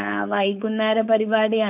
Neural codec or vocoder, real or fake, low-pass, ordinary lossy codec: codec, 16 kHz, 4.8 kbps, FACodec; fake; 3.6 kHz; none